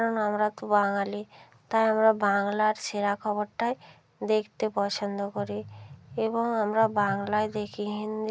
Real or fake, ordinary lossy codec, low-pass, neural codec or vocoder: real; none; none; none